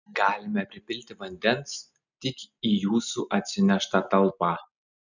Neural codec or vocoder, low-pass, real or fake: none; 7.2 kHz; real